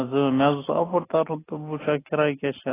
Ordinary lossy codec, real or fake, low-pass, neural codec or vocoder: AAC, 16 kbps; real; 3.6 kHz; none